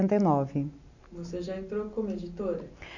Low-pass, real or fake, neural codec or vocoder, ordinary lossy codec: 7.2 kHz; real; none; none